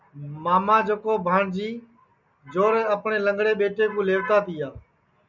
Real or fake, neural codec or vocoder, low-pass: real; none; 7.2 kHz